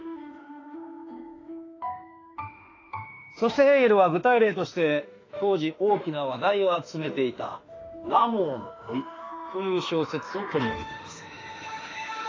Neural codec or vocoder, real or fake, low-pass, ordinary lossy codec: autoencoder, 48 kHz, 32 numbers a frame, DAC-VAE, trained on Japanese speech; fake; 7.2 kHz; AAC, 32 kbps